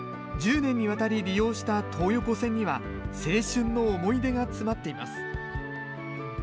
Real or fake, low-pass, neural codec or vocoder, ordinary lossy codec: real; none; none; none